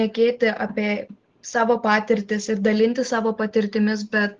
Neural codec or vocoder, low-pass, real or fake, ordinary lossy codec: none; 7.2 kHz; real; Opus, 16 kbps